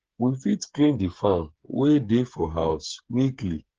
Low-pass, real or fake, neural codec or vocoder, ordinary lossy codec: 7.2 kHz; fake; codec, 16 kHz, 8 kbps, FreqCodec, smaller model; Opus, 16 kbps